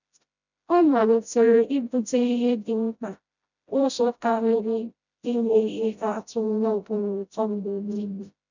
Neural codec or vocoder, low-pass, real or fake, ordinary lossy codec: codec, 16 kHz, 0.5 kbps, FreqCodec, smaller model; 7.2 kHz; fake; none